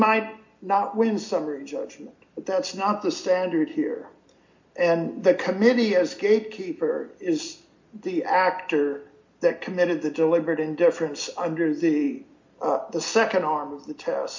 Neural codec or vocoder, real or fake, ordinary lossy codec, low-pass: none; real; MP3, 48 kbps; 7.2 kHz